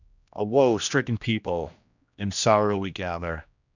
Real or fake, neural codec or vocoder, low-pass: fake; codec, 16 kHz, 1 kbps, X-Codec, HuBERT features, trained on general audio; 7.2 kHz